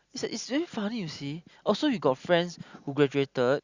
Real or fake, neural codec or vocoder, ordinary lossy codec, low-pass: real; none; Opus, 64 kbps; 7.2 kHz